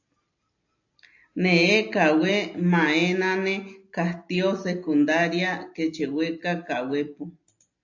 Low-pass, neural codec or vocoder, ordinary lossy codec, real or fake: 7.2 kHz; none; AAC, 48 kbps; real